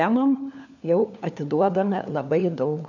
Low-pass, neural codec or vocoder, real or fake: 7.2 kHz; codec, 16 kHz, 4 kbps, FunCodec, trained on LibriTTS, 50 frames a second; fake